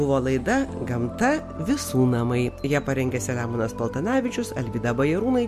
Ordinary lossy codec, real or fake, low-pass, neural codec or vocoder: MP3, 64 kbps; real; 14.4 kHz; none